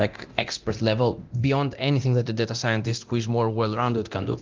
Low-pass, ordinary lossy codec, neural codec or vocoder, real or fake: 7.2 kHz; Opus, 32 kbps; codec, 24 kHz, 0.9 kbps, DualCodec; fake